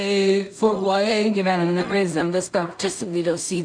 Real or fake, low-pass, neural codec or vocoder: fake; 9.9 kHz; codec, 16 kHz in and 24 kHz out, 0.4 kbps, LongCat-Audio-Codec, two codebook decoder